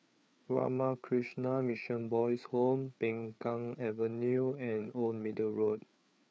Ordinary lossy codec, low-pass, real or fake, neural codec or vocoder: none; none; fake; codec, 16 kHz, 4 kbps, FreqCodec, larger model